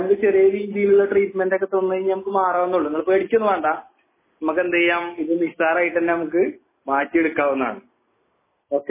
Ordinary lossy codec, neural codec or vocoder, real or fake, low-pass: MP3, 16 kbps; none; real; 3.6 kHz